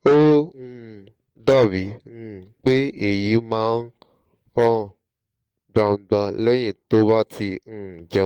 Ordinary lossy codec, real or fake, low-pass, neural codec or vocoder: Opus, 24 kbps; fake; 19.8 kHz; codec, 44.1 kHz, 7.8 kbps, DAC